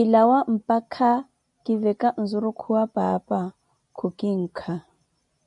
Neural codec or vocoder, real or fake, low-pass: none; real; 10.8 kHz